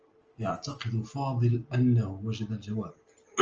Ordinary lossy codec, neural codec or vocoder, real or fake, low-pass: Opus, 24 kbps; none; real; 7.2 kHz